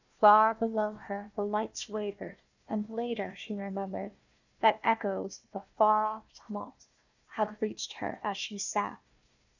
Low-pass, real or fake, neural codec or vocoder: 7.2 kHz; fake; codec, 16 kHz, 1 kbps, FunCodec, trained on Chinese and English, 50 frames a second